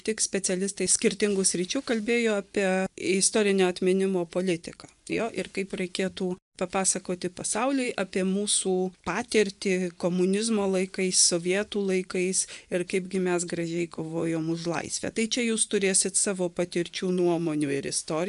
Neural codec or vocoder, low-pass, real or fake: vocoder, 24 kHz, 100 mel bands, Vocos; 10.8 kHz; fake